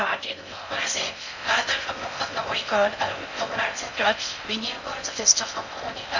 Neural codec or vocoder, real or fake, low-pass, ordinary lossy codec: codec, 16 kHz in and 24 kHz out, 0.6 kbps, FocalCodec, streaming, 4096 codes; fake; 7.2 kHz; none